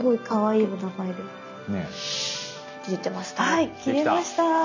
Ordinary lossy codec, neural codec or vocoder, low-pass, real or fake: none; none; 7.2 kHz; real